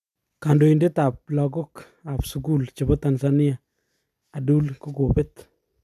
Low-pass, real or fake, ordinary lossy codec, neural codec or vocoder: 14.4 kHz; real; none; none